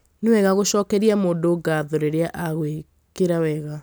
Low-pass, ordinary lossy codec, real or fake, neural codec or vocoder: none; none; real; none